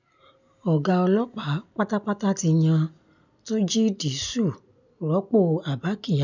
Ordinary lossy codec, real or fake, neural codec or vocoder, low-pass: none; real; none; 7.2 kHz